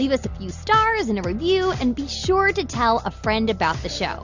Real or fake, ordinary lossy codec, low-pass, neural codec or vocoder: real; Opus, 64 kbps; 7.2 kHz; none